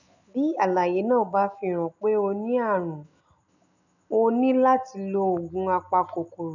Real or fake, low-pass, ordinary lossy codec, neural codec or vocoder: real; 7.2 kHz; none; none